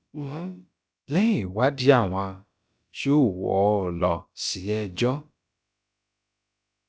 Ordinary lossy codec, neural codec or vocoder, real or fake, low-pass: none; codec, 16 kHz, about 1 kbps, DyCAST, with the encoder's durations; fake; none